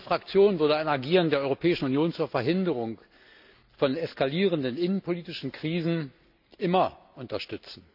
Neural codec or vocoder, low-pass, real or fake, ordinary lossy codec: none; 5.4 kHz; real; none